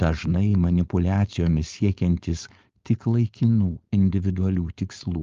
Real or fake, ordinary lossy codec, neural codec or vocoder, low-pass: fake; Opus, 32 kbps; codec, 16 kHz, 4.8 kbps, FACodec; 7.2 kHz